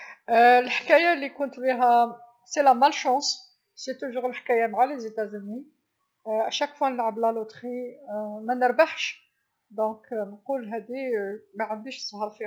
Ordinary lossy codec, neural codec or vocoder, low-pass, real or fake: none; none; none; real